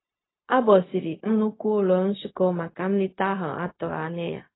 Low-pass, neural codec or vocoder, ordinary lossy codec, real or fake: 7.2 kHz; codec, 16 kHz, 0.4 kbps, LongCat-Audio-Codec; AAC, 16 kbps; fake